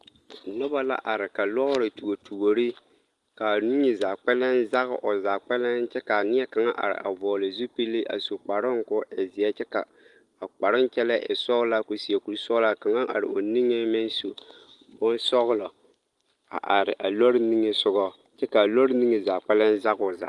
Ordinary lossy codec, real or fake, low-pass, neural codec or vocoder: Opus, 24 kbps; real; 10.8 kHz; none